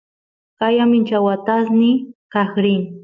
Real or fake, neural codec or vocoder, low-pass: real; none; 7.2 kHz